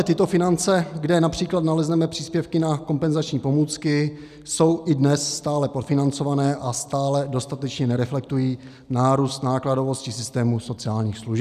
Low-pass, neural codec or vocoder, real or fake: 14.4 kHz; none; real